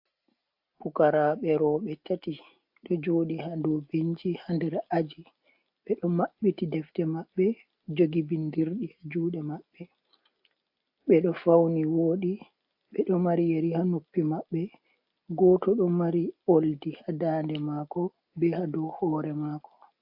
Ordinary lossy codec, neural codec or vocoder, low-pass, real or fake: AAC, 48 kbps; none; 5.4 kHz; real